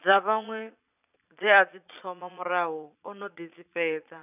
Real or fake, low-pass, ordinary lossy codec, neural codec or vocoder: fake; 3.6 kHz; none; vocoder, 22.05 kHz, 80 mel bands, Vocos